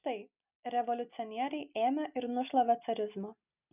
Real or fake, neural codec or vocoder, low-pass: real; none; 3.6 kHz